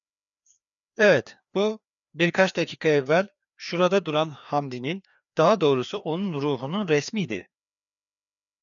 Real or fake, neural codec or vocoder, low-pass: fake; codec, 16 kHz, 2 kbps, FreqCodec, larger model; 7.2 kHz